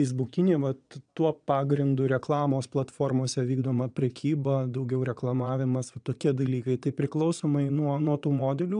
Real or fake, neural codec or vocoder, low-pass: fake; vocoder, 22.05 kHz, 80 mel bands, Vocos; 9.9 kHz